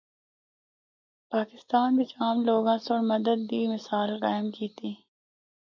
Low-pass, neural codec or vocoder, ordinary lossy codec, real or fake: 7.2 kHz; none; MP3, 64 kbps; real